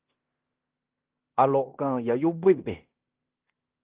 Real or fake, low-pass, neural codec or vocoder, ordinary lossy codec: fake; 3.6 kHz; codec, 16 kHz in and 24 kHz out, 0.9 kbps, LongCat-Audio-Codec, fine tuned four codebook decoder; Opus, 16 kbps